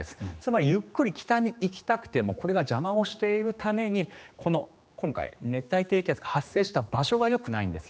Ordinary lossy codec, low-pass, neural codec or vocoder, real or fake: none; none; codec, 16 kHz, 2 kbps, X-Codec, HuBERT features, trained on general audio; fake